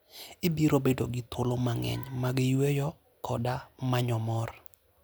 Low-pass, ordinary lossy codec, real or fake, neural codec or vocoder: none; none; real; none